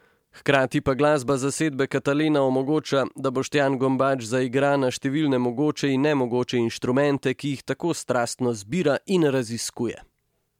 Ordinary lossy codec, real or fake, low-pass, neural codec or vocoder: MP3, 96 kbps; real; 19.8 kHz; none